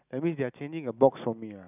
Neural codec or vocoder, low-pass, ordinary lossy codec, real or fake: none; 3.6 kHz; none; real